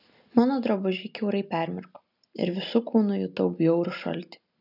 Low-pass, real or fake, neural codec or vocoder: 5.4 kHz; real; none